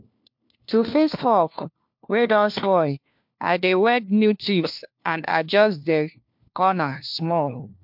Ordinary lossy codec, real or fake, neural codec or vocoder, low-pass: MP3, 48 kbps; fake; codec, 16 kHz, 1 kbps, FunCodec, trained on LibriTTS, 50 frames a second; 5.4 kHz